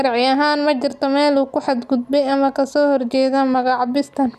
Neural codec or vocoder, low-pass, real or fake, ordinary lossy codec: none; 14.4 kHz; real; none